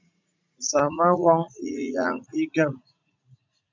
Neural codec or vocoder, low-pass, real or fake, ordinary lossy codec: vocoder, 44.1 kHz, 80 mel bands, Vocos; 7.2 kHz; fake; MP3, 64 kbps